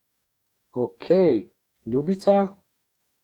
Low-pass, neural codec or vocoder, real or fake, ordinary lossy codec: 19.8 kHz; codec, 44.1 kHz, 2.6 kbps, DAC; fake; none